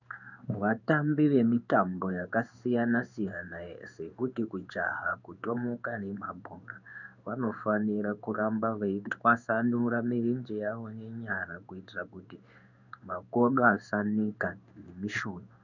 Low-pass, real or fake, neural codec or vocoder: 7.2 kHz; fake; codec, 16 kHz in and 24 kHz out, 1 kbps, XY-Tokenizer